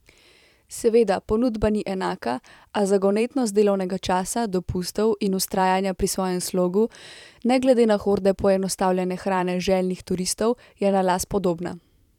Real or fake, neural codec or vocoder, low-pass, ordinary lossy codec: fake; vocoder, 44.1 kHz, 128 mel bands every 512 samples, BigVGAN v2; 19.8 kHz; none